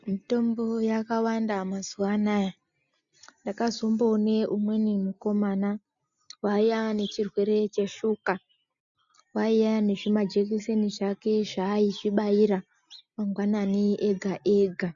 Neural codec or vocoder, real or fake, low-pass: none; real; 7.2 kHz